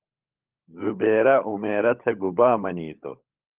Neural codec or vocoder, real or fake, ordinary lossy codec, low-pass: codec, 16 kHz, 16 kbps, FunCodec, trained on LibriTTS, 50 frames a second; fake; Opus, 32 kbps; 3.6 kHz